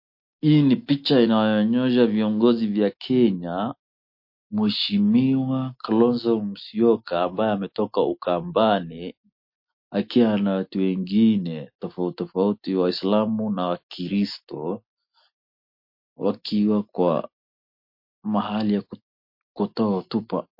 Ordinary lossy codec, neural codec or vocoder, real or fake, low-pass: MP3, 32 kbps; none; real; 5.4 kHz